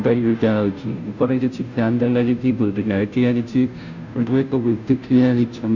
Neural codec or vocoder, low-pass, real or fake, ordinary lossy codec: codec, 16 kHz, 0.5 kbps, FunCodec, trained on Chinese and English, 25 frames a second; 7.2 kHz; fake; none